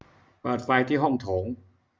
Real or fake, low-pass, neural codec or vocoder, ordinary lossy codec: real; none; none; none